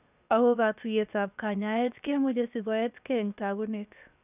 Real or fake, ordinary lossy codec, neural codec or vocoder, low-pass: fake; none; codec, 16 kHz, 0.7 kbps, FocalCodec; 3.6 kHz